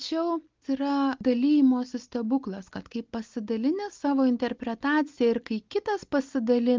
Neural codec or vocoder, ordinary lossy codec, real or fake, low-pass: none; Opus, 32 kbps; real; 7.2 kHz